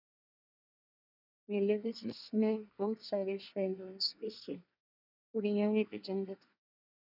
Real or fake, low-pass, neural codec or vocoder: fake; 5.4 kHz; codec, 24 kHz, 1 kbps, SNAC